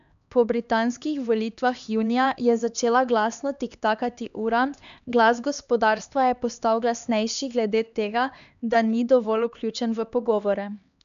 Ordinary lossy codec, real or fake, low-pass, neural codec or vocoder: none; fake; 7.2 kHz; codec, 16 kHz, 2 kbps, X-Codec, HuBERT features, trained on LibriSpeech